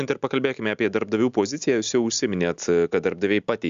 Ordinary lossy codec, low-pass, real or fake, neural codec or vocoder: Opus, 64 kbps; 7.2 kHz; real; none